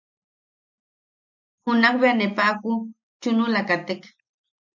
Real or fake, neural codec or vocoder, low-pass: real; none; 7.2 kHz